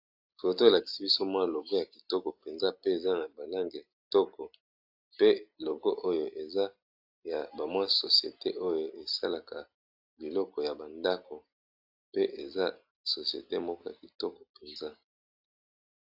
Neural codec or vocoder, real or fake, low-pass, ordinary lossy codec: none; real; 5.4 kHz; AAC, 48 kbps